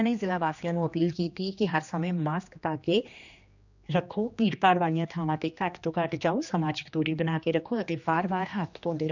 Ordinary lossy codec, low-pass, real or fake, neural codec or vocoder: none; 7.2 kHz; fake; codec, 16 kHz, 2 kbps, X-Codec, HuBERT features, trained on general audio